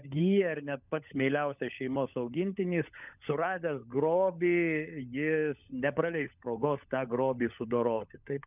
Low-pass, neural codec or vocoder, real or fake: 3.6 kHz; codec, 16 kHz, 16 kbps, FunCodec, trained on LibriTTS, 50 frames a second; fake